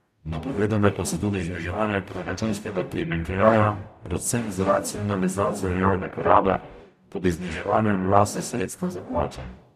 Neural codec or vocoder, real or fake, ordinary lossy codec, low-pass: codec, 44.1 kHz, 0.9 kbps, DAC; fake; none; 14.4 kHz